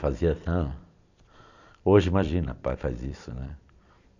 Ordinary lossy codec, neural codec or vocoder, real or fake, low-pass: none; vocoder, 44.1 kHz, 128 mel bands every 256 samples, BigVGAN v2; fake; 7.2 kHz